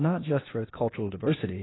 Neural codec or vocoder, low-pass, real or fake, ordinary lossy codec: codec, 16 kHz, 0.8 kbps, ZipCodec; 7.2 kHz; fake; AAC, 16 kbps